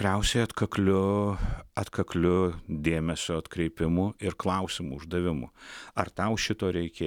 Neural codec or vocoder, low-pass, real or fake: none; 19.8 kHz; real